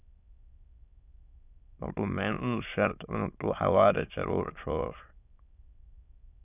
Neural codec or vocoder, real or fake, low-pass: autoencoder, 22.05 kHz, a latent of 192 numbers a frame, VITS, trained on many speakers; fake; 3.6 kHz